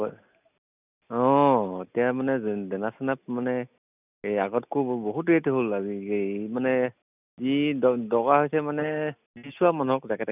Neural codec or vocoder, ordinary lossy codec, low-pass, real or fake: none; none; 3.6 kHz; real